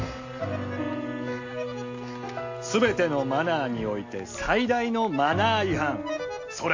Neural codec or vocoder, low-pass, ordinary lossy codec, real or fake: none; 7.2 kHz; AAC, 48 kbps; real